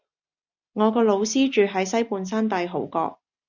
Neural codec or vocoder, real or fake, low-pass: none; real; 7.2 kHz